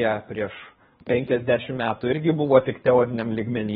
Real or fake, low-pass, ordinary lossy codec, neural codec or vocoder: fake; 10.8 kHz; AAC, 16 kbps; codec, 24 kHz, 3 kbps, HILCodec